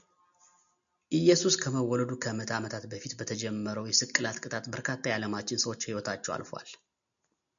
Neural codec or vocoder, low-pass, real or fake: none; 7.2 kHz; real